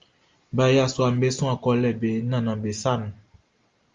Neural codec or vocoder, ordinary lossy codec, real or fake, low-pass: none; Opus, 32 kbps; real; 7.2 kHz